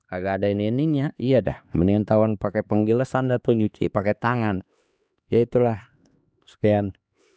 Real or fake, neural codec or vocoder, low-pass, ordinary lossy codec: fake; codec, 16 kHz, 2 kbps, X-Codec, HuBERT features, trained on LibriSpeech; none; none